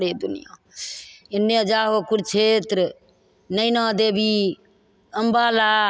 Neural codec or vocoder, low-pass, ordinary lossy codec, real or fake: none; none; none; real